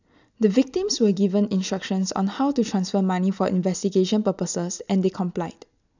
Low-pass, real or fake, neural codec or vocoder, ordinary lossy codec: 7.2 kHz; real; none; none